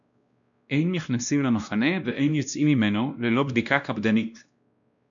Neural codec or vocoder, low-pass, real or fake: codec, 16 kHz, 1 kbps, X-Codec, WavLM features, trained on Multilingual LibriSpeech; 7.2 kHz; fake